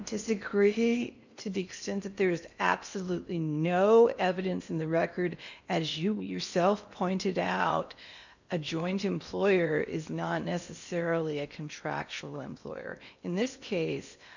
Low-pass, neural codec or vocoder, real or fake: 7.2 kHz; codec, 16 kHz in and 24 kHz out, 0.8 kbps, FocalCodec, streaming, 65536 codes; fake